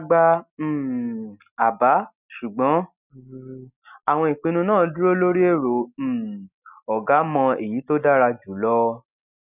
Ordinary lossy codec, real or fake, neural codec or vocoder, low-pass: none; real; none; 3.6 kHz